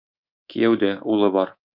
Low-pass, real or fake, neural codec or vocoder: 5.4 kHz; fake; codec, 24 kHz, 3.1 kbps, DualCodec